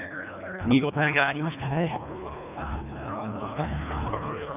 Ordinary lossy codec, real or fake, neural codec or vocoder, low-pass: none; fake; codec, 24 kHz, 1.5 kbps, HILCodec; 3.6 kHz